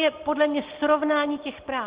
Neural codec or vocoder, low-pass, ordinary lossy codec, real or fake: none; 3.6 kHz; Opus, 16 kbps; real